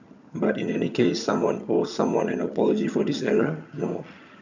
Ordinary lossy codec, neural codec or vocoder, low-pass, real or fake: none; vocoder, 22.05 kHz, 80 mel bands, HiFi-GAN; 7.2 kHz; fake